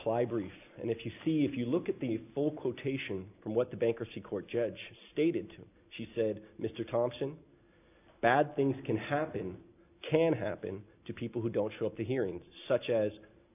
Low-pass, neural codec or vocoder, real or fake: 3.6 kHz; none; real